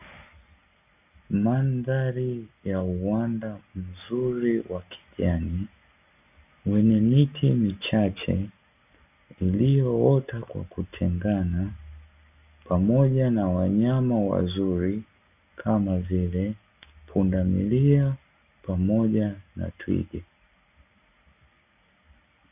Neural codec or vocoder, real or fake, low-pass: none; real; 3.6 kHz